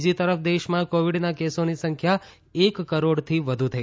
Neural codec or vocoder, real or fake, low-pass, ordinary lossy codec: none; real; none; none